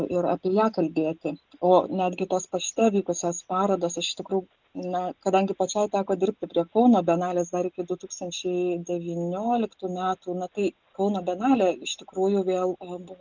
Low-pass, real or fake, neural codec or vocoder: 7.2 kHz; real; none